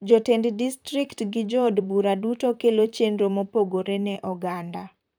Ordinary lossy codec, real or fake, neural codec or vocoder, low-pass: none; fake; vocoder, 44.1 kHz, 128 mel bands, Pupu-Vocoder; none